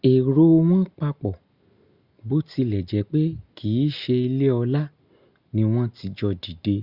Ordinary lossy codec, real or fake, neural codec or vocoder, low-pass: Opus, 64 kbps; real; none; 5.4 kHz